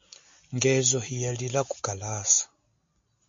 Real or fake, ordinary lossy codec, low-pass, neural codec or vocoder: real; MP3, 48 kbps; 7.2 kHz; none